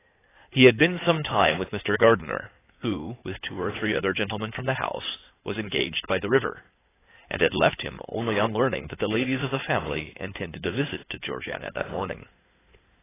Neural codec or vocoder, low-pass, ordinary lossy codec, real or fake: codec, 16 kHz in and 24 kHz out, 2.2 kbps, FireRedTTS-2 codec; 3.6 kHz; AAC, 16 kbps; fake